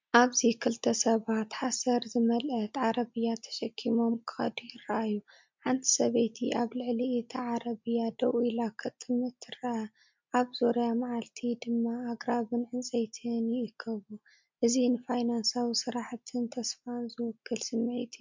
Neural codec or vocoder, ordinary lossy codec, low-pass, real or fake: none; MP3, 64 kbps; 7.2 kHz; real